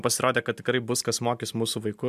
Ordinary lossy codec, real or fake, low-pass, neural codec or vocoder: MP3, 96 kbps; real; 14.4 kHz; none